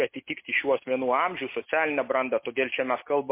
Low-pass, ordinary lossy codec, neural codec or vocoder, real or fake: 3.6 kHz; MP3, 24 kbps; none; real